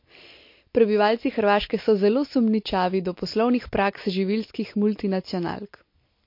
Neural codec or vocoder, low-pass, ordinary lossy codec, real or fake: none; 5.4 kHz; MP3, 32 kbps; real